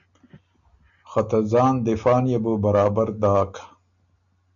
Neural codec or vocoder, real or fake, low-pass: none; real; 7.2 kHz